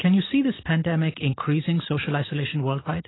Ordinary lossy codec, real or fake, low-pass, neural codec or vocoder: AAC, 16 kbps; real; 7.2 kHz; none